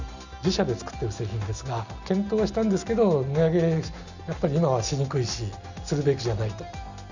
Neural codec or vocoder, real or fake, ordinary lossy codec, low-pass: none; real; none; 7.2 kHz